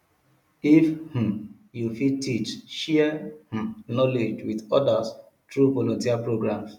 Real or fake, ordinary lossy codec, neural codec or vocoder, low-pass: real; none; none; 19.8 kHz